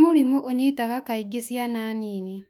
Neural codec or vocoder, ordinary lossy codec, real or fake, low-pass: autoencoder, 48 kHz, 32 numbers a frame, DAC-VAE, trained on Japanese speech; none; fake; 19.8 kHz